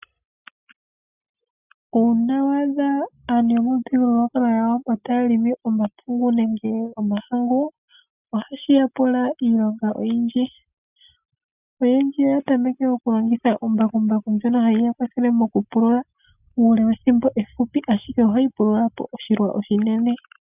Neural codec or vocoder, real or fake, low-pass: none; real; 3.6 kHz